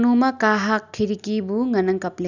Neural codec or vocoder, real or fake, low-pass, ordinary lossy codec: none; real; 7.2 kHz; none